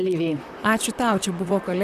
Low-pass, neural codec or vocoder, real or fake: 14.4 kHz; vocoder, 44.1 kHz, 128 mel bands, Pupu-Vocoder; fake